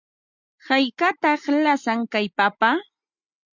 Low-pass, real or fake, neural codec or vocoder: 7.2 kHz; real; none